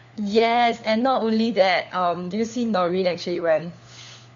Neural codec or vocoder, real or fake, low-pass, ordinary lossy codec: codec, 16 kHz, 4 kbps, FunCodec, trained on LibriTTS, 50 frames a second; fake; 7.2 kHz; MP3, 64 kbps